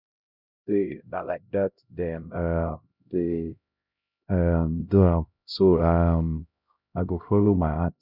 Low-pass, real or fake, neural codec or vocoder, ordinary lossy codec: 5.4 kHz; fake; codec, 16 kHz, 0.5 kbps, X-Codec, HuBERT features, trained on LibriSpeech; none